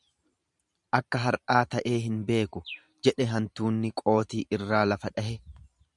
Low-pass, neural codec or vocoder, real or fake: 10.8 kHz; vocoder, 44.1 kHz, 128 mel bands every 512 samples, BigVGAN v2; fake